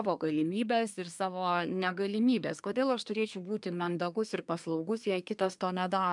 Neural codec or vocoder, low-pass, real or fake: codec, 24 kHz, 1 kbps, SNAC; 10.8 kHz; fake